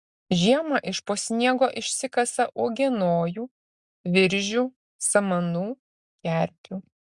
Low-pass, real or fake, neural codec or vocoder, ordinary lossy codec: 10.8 kHz; real; none; Opus, 64 kbps